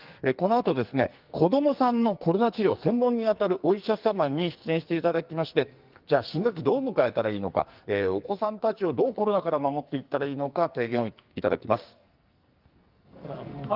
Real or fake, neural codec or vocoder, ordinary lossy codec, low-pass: fake; codec, 44.1 kHz, 2.6 kbps, SNAC; Opus, 32 kbps; 5.4 kHz